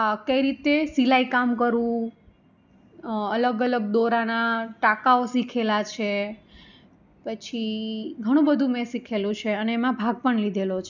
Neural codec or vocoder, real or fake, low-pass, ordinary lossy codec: none; real; 7.2 kHz; none